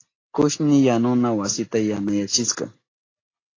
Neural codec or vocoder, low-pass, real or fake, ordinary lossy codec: none; 7.2 kHz; real; AAC, 32 kbps